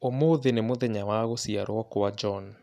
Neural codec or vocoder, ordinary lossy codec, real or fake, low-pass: none; none; real; 14.4 kHz